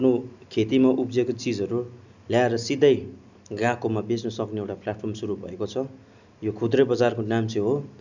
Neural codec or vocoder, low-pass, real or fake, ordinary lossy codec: none; 7.2 kHz; real; none